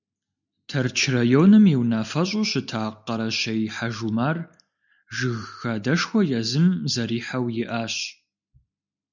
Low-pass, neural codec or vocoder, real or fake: 7.2 kHz; none; real